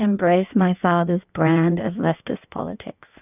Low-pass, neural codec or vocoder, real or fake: 3.6 kHz; codec, 16 kHz in and 24 kHz out, 1.1 kbps, FireRedTTS-2 codec; fake